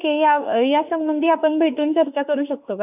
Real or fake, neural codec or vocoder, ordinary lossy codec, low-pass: fake; autoencoder, 48 kHz, 32 numbers a frame, DAC-VAE, trained on Japanese speech; none; 3.6 kHz